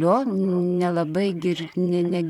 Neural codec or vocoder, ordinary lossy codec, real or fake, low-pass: vocoder, 44.1 kHz, 128 mel bands, Pupu-Vocoder; MP3, 96 kbps; fake; 19.8 kHz